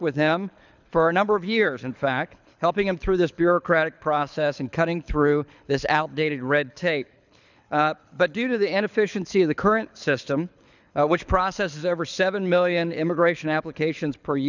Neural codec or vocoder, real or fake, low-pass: codec, 24 kHz, 6 kbps, HILCodec; fake; 7.2 kHz